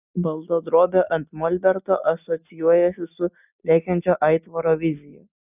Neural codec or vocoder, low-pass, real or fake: none; 3.6 kHz; real